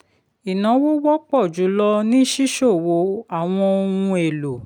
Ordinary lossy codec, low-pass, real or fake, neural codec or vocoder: none; 19.8 kHz; real; none